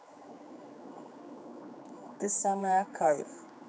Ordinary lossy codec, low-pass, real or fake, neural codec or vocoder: none; none; fake; codec, 16 kHz, 4 kbps, X-Codec, HuBERT features, trained on general audio